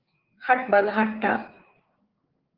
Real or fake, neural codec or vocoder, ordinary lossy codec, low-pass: fake; codec, 16 kHz, 4 kbps, FreqCodec, larger model; Opus, 16 kbps; 5.4 kHz